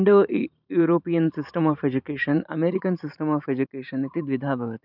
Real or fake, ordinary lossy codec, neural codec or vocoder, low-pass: fake; none; vocoder, 44.1 kHz, 128 mel bands every 512 samples, BigVGAN v2; 5.4 kHz